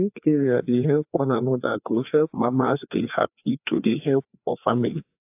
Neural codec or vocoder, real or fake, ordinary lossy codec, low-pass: codec, 16 kHz, 2 kbps, FreqCodec, larger model; fake; AAC, 32 kbps; 3.6 kHz